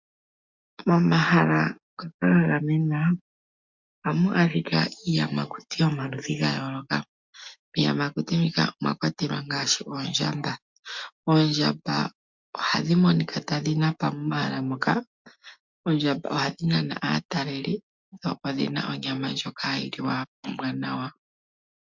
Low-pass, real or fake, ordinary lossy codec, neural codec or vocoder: 7.2 kHz; real; MP3, 64 kbps; none